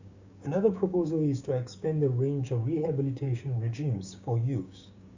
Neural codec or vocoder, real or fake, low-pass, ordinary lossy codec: codec, 16 kHz, 6 kbps, DAC; fake; 7.2 kHz; Opus, 64 kbps